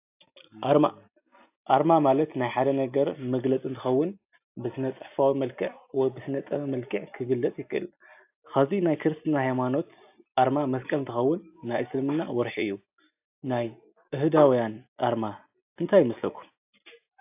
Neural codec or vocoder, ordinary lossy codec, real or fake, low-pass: none; AAC, 32 kbps; real; 3.6 kHz